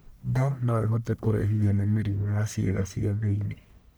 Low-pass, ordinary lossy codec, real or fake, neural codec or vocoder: none; none; fake; codec, 44.1 kHz, 1.7 kbps, Pupu-Codec